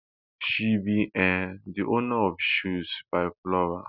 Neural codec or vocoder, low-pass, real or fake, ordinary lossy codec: none; 5.4 kHz; real; none